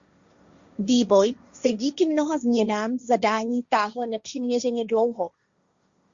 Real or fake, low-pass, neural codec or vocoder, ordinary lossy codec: fake; 7.2 kHz; codec, 16 kHz, 1.1 kbps, Voila-Tokenizer; Opus, 64 kbps